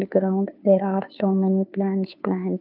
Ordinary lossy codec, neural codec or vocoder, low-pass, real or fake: none; codec, 16 kHz, 2 kbps, FunCodec, trained on Chinese and English, 25 frames a second; 5.4 kHz; fake